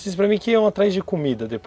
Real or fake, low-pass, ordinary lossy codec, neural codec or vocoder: real; none; none; none